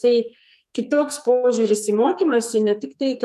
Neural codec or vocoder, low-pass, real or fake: codec, 44.1 kHz, 2.6 kbps, SNAC; 14.4 kHz; fake